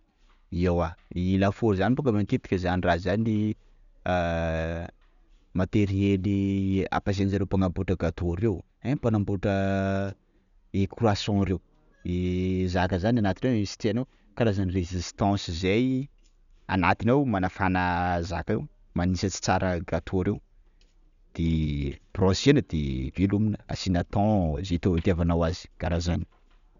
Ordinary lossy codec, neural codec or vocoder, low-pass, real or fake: none; none; 7.2 kHz; real